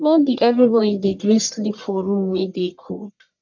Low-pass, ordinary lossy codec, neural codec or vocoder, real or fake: 7.2 kHz; none; codec, 44.1 kHz, 1.7 kbps, Pupu-Codec; fake